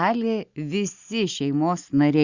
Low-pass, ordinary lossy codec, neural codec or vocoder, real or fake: 7.2 kHz; Opus, 64 kbps; none; real